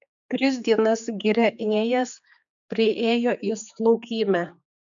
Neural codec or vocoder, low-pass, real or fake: codec, 16 kHz, 4 kbps, X-Codec, HuBERT features, trained on general audio; 7.2 kHz; fake